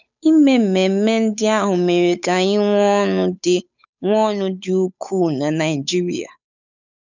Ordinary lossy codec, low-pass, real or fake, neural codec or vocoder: none; 7.2 kHz; fake; codec, 16 kHz, 8 kbps, FunCodec, trained on Chinese and English, 25 frames a second